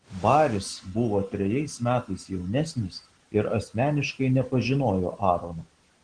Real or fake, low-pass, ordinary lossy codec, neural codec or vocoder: real; 9.9 kHz; Opus, 16 kbps; none